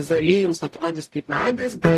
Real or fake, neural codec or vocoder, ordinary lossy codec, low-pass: fake; codec, 44.1 kHz, 0.9 kbps, DAC; AAC, 64 kbps; 14.4 kHz